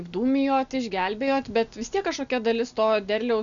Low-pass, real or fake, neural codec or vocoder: 7.2 kHz; real; none